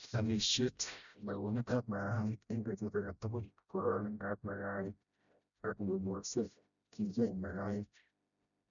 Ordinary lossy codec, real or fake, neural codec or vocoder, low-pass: none; fake; codec, 16 kHz, 0.5 kbps, FreqCodec, smaller model; 7.2 kHz